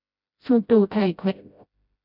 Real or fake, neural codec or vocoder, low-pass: fake; codec, 16 kHz, 0.5 kbps, FreqCodec, smaller model; 5.4 kHz